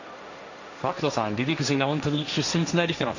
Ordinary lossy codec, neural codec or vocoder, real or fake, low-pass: none; codec, 16 kHz, 1.1 kbps, Voila-Tokenizer; fake; 7.2 kHz